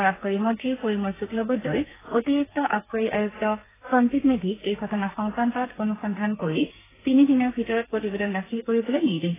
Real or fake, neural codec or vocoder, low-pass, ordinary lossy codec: fake; codec, 32 kHz, 1.9 kbps, SNAC; 3.6 kHz; AAC, 16 kbps